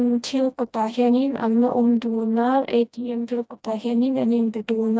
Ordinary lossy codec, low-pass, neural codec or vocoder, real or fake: none; none; codec, 16 kHz, 1 kbps, FreqCodec, smaller model; fake